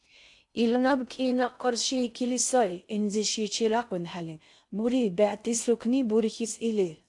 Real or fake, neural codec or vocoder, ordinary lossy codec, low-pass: fake; codec, 16 kHz in and 24 kHz out, 0.6 kbps, FocalCodec, streaming, 2048 codes; AAC, 64 kbps; 10.8 kHz